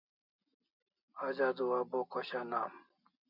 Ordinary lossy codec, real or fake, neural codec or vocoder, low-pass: MP3, 48 kbps; real; none; 5.4 kHz